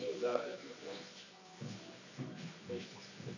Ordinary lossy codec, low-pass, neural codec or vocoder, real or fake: AAC, 48 kbps; 7.2 kHz; codec, 24 kHz, 0.9 kbps, WavTokenizer, medium music audio release; fake